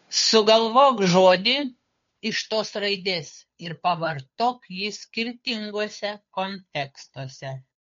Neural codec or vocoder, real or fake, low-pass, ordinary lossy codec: codec, 16 kHz, 2 kbps, FunCodec, trained on Chinese and English, 25 frames a second; fake; 7.2 kHz; MP3, 48 kbps